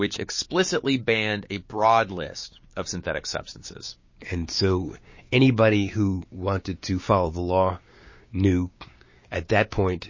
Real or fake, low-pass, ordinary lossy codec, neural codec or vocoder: fake; 7.2 kHz; MP3, 32 kbps; codec, 16 kHz, 6 kbps, DAC